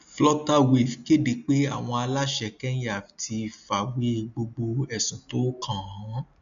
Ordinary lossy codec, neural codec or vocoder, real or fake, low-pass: none; none; real; 7.2 kHz